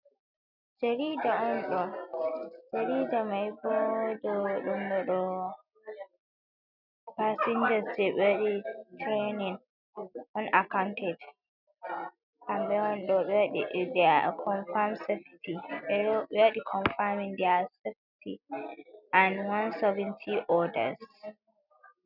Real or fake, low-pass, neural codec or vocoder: real; 5.4 kHz; none